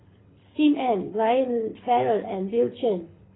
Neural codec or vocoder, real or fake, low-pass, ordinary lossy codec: codec, 24 kHz, 6 kbps, HILCodec; fake; 7.2 kHz; AAC, 16 kbps